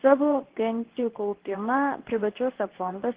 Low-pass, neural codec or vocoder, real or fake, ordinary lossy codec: 3.6 kHz; codec, 24 kHz, 0.9 kbps, WavTokenizer, medium speech release version 2; fake; Opus, 16 kbps